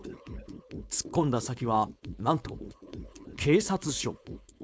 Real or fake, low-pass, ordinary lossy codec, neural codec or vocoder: fake; none; none; codec, 16 kHz, 4.8 kbps, FACodec